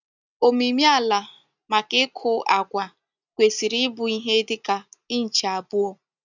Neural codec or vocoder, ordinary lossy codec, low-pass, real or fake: none; none; 7.2 kHz; real